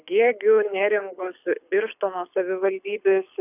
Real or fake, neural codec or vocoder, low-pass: fake; codec, 24 kHz, 6 kbps, HILCodec; 3.6 kHz